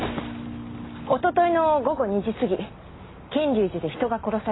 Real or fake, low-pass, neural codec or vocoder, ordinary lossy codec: real; 7.2 kHz; none; AAC, 16 kbps